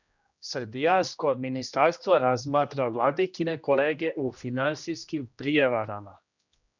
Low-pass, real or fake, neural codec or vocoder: 7.2 kHz; fake; codec, 16 kHz, 1 kbps, X-Codec, HuBERT features, trained on general audio